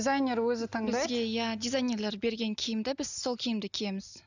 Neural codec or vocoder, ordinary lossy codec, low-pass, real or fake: none; none; 7.2 kHz; real